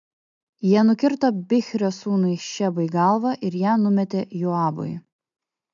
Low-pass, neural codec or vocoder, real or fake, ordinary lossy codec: 7.2 kHz; none; real; MP3, 64 kbps